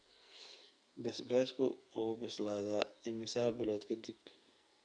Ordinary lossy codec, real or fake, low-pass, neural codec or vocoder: none; fake; 9.9 kHz; codec, 44.1 kHz, 2.6 kbps, SNAC